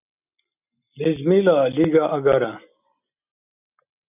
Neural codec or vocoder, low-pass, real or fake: none; 3.6 kHz; real